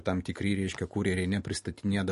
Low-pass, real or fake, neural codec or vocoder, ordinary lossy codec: 14.4 kHz; real; none; MP3, 48 kbps